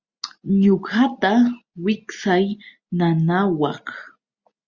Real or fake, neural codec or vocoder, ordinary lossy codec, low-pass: real; none; Opus, 64 kbps; 7.2 kHz